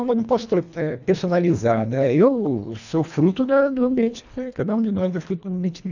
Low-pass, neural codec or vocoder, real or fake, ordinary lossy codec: 7.2 kHz; codec, 24 kHz, 1.5 kbps, HILCodec; fake; none